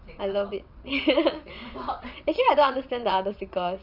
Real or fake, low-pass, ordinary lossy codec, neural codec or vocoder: fake; 5.4 kHz; none; vocoder, 22.05 kHz, 80 mel bands, WaveNeXt